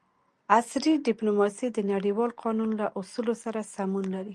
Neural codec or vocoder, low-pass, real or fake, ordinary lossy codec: none; 10.8 kHz; real; Opus, 32 kbps